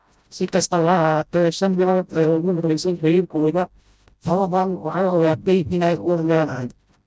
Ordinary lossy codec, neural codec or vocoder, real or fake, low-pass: none; codec, 16 kHz, 0.5 kbps, FreqCodec, smaller model; fake; none